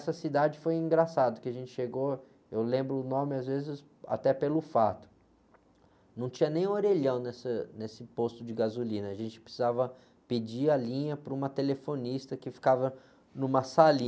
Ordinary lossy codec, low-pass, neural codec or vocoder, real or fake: none; none; none; real